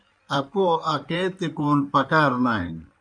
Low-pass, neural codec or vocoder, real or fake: 9.9 kHz; codec, 16 kHz in and 24 kHz out, 2.2 kbps, FireRedTTS-2 codec; fake